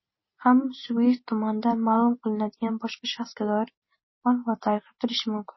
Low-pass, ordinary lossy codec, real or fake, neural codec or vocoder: 7.2 kHz; MP3, 24 kbps; real; none